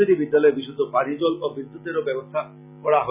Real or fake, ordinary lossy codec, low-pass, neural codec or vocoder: real; Opus, 64 kbps; 3.6 kHz; none